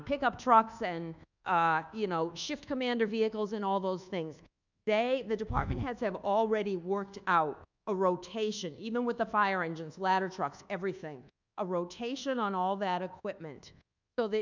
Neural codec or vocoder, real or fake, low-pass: codec, 24 kHz, 1.2 kbps, DualCodec; fake; 7.2 kHz